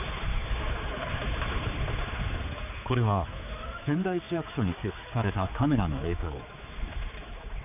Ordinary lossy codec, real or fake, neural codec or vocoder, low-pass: none; fake; codec, 16 kHz, 4 kbps, X-Codec, HuBERT features, trained on general audio; 3.6 kHz